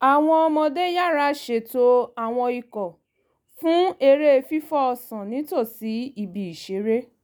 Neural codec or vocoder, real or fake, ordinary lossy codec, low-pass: none; real; none; none